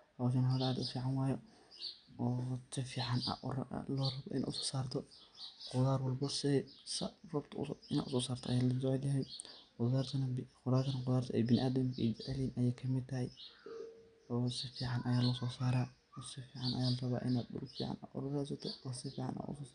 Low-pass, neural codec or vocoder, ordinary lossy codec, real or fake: 9.9 kHz; none; none; real